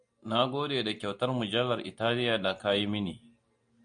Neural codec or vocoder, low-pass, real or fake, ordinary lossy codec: none; 9.9 kHz; real; MP3, 96 kbps